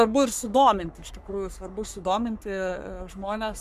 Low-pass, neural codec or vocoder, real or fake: 14.4 kHz; codec, 44.1 kHz, 3.4 kbps, Pupu-Codec; fake